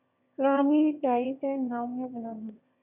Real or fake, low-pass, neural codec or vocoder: fake; 3.6 kHz; autoencoder, 22.05 kHz, a latent of 192 numbers a frame, VITS, trained on one speaker